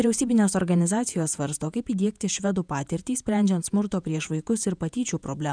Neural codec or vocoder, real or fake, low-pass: vocoder, 48 kHz, 128 mel bands, Vocos; fake; 9.9 kHz